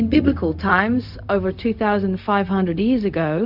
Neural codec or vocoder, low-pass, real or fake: codec, 16 kHz, 0.4 kbps, LongCat-Audio-Codec; 5.4 kHz; fake